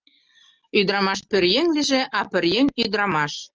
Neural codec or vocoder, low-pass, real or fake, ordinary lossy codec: none; 7.2 kHz; real; Opus, 24 kbps